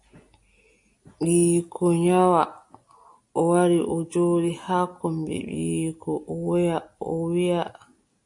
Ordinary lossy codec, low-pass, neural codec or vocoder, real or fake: AAC, 64 kbps; 10.8 kHz; none; real